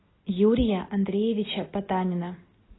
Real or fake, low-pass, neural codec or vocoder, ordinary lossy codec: real; 7.2 kHz; none; AAC, 16 kbps